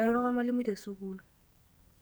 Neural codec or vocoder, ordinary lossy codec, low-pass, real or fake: codec, 44.1 kHz, 2.6 kbps, SNAC; none; none; fake